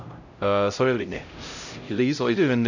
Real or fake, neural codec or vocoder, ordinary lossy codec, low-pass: fake; codec, 16 kHz, 0.5 kbps, X-Codec, HuBERT features, trained on LibriSpeech; none; 7.2 kHz